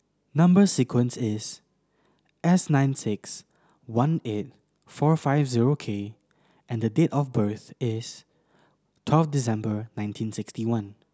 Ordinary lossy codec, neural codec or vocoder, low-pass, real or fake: none; none; none; real